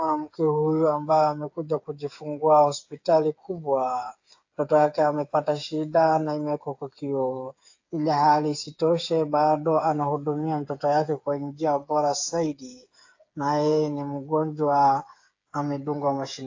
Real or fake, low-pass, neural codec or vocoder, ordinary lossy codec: fake; 7.2 kHz; codec, 16 kHz, 8 kbps, FreqCodec, smaller model; AAC, 48 kbps